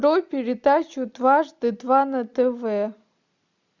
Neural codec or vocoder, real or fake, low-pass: none; real; 7.2 kHz